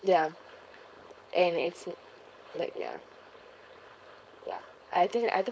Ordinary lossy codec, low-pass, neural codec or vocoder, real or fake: none; none; codec, 16 kHz, 4.8 kbps, FACodec; fake